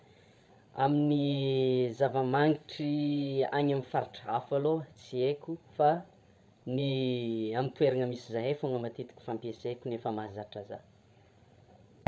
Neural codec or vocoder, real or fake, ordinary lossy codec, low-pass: codec, 16 kHz, 16 kbps, FreqCodec, larger model; fake; none; none